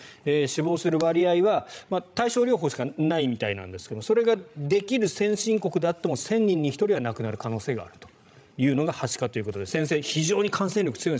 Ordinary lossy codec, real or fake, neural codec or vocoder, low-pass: none; fake; codec, 16 kHz, 16 kbps, FreqCodec, larger model; none